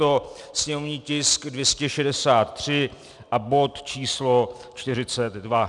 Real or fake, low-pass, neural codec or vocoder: real; 10.8 kHz; none